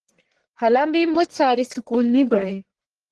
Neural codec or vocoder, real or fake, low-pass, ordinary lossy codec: codec, 44.1 kHz, 1.7 kbps, Pupu-Codec; fake; 10.8 kHz; Opus, 16 kbps